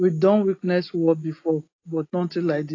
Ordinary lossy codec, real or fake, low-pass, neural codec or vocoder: AAC, 32 kbps; real; 7.2 kHz; none